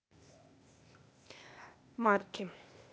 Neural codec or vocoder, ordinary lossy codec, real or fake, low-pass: codec, 16 kHz, 0.8 kbps, ZipCodec; none; fake; none